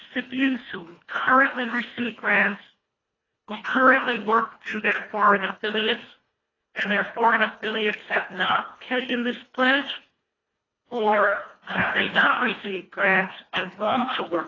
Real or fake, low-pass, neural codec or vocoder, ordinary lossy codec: fake; 7.2 kHz; codec, 24 kHz, 1.5 kbps, HILCodec; AAC, 32 kbps